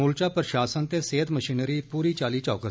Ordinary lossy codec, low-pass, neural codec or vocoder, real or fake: none; none; none; real